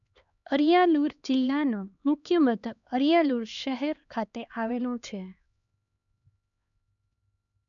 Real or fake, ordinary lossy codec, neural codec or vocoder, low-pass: fake; none; codec, 16 kHz, 2 kbps, X-Codec, HuBERT features, trained on LibriSpeech; 7.2 kHz